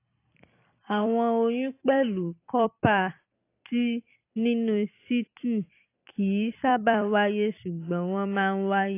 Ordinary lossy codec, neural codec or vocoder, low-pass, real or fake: AAC, 24 kbps; vocoder, 44.1 kHz, 128 mel bands every 256 samples, BigVGAN v2; 3.6 kHz; fake